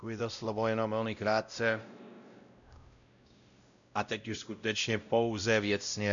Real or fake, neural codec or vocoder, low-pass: fake; codec, 16 kHz, 0.5 kbps, X-Codec, WavLM features, trained on Multilingual LibriSpeech; 7.2 kHz